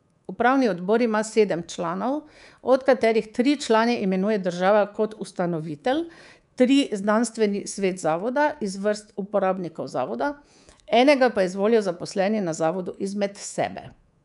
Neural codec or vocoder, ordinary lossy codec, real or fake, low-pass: codec, 24 kHz, 3.1 kbps, DualCodec; none; fake; 10.8 kHz